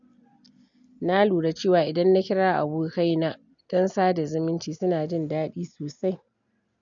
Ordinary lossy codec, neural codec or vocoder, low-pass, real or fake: none; none; 7.2 kHz; real